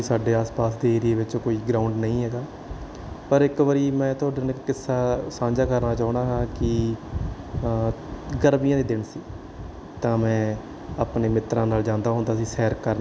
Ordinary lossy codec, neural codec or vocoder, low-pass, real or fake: none; none; none; real